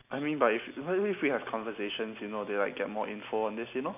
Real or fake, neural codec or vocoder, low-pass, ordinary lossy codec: real; none; 3.6 kHz; none